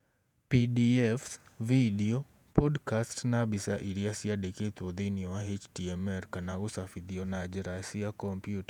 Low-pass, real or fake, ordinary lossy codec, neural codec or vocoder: 19.8 kHz; fake; none; vocoder, 48 kHz, 128 mel bands, Vocos